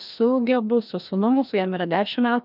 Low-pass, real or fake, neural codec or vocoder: 5.4 kHz; fake; codec, 16 kHz, 1 kbps, FreqCodec, larger model